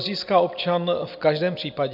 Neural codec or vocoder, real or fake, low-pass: none; real; 5.4 kHz